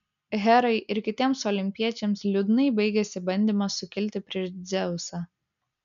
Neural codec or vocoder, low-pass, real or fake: none; 7.2 kHz; real